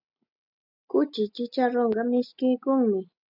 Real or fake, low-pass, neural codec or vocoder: real; 5.4 kHz; none